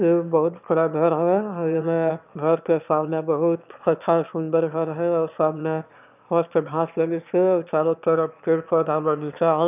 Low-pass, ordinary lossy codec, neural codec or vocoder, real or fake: 3.6 kHz; none; autoencoder, 22.05 kHz, a latent of 192 numbers a frame, VITS, trained on one speaker; fake